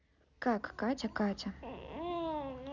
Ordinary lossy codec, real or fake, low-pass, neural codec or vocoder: none; real; 7.2 kHz; none